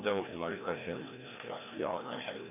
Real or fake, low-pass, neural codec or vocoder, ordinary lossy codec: fake; 3.6 kHz; codec, 16 kHz, 1 kbps, FreqCodec, larger model; none